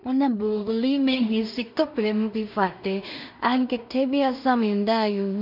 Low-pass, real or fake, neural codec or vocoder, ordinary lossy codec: 5.4 kHz; fake; codec, 16 kHz in and 24 kHz out, 0.4 kbps, LongCat-Audio-Codec, two codebook decoder; none